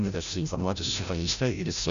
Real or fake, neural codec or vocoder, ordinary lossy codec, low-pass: fake; codec, 16 kHz, 0.5 kbps, FreqCodec, larger model; AAC, 96 kbps; 7.2 kHz